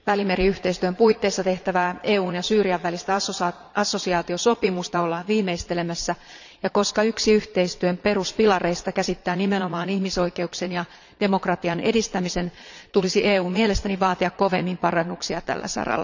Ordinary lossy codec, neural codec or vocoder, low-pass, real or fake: none; vocoder, 22.05 kHz, 80 mel bands, Vocos; 7.2 kHz; fake